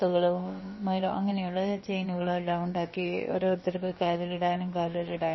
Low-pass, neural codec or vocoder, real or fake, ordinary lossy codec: 7.2 kHz; codec, 16 kHz, about 1 kbps, DyCAST, with the encoder's durations; fake; MP3, 24 kbps